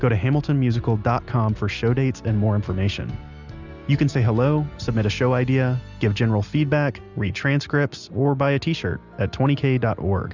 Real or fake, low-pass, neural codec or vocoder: real; 7.2 kHz; none